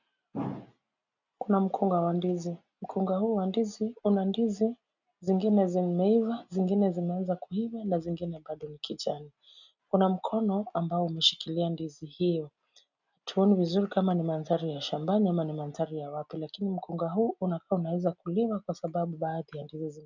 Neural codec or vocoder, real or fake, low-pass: none; real; 7.2 kHz